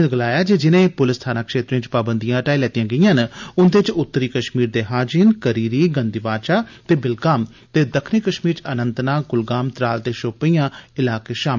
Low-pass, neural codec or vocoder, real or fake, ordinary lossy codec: 7.2 kHz; none; real; MP3, 64 kbps